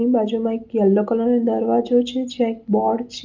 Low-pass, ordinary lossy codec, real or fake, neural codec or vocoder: 7.2 kHz; Opus, 24 kbps; real; none